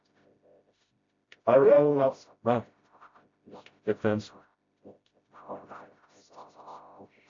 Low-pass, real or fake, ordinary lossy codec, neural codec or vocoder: 7.2 kHz; fake; MP3, 48 kbps; codec, 16 kHz, 0.5 kbps, FreqCodec, smaller model